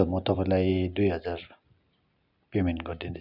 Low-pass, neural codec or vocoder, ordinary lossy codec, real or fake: 5.4 kHz; none; none; real